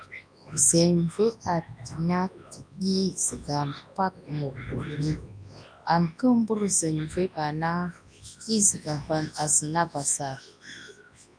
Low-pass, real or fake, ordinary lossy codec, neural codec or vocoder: 9.9 kHz; fake; MP3, 96 kbps; codec, 24 kHz, 0.9 kbps, WavTokenizer, large speech release